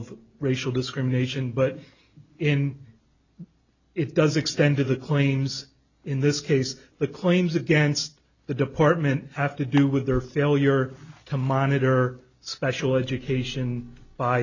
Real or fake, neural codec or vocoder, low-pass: real; none; 7.2 kHz